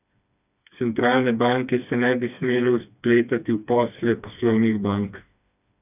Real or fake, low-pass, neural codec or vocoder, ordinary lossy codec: fake; 3.6 kHz; codec, 16 kHz, 2 kbps, FreqCodec, smaller model; none